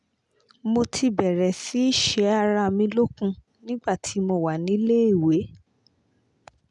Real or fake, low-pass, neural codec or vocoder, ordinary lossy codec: real; 10.8 kHz; none; none